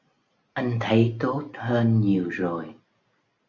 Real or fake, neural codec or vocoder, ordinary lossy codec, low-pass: real; none; Opus, 64 kbps; 7.2 kHz